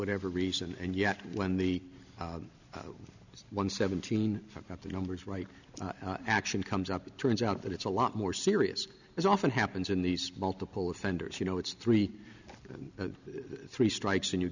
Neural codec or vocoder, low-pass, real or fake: none; 7.2 kHz; real